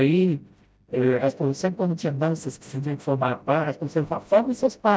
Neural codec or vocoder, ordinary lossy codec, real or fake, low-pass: codec, 16 kHz, 0.5 kbps, FreqCodec, smaller model; none; fake; none